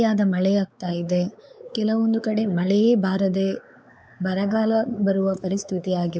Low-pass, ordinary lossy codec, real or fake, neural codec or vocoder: none; none; fake; codec, 16 kHz, 4 kbps, X-Codec, WavLM features, trained on Multilingual LibriSpeech